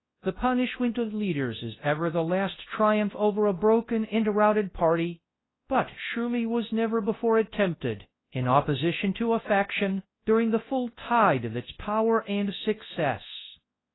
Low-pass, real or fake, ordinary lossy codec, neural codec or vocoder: 7.2 kHz; fake; AAC, 16 kbps; codec, 24 kHz, 0.9 kbps, WavTokenizer, large speech release